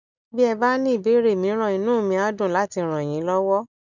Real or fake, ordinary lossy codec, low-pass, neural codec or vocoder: real; none; 7.2 kHz; none